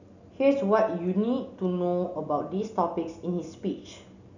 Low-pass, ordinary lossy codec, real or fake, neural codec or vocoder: 7.2 kHz; none; real; none